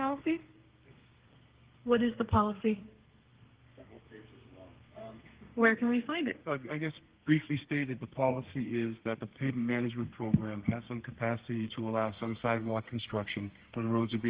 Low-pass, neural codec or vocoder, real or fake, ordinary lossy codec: 3.6 kHz; codec, 32 kHz, 1.9 kbps, SNAC; fake; Opus, 16 kbps